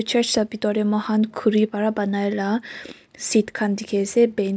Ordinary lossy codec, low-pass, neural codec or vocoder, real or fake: none; none; none; real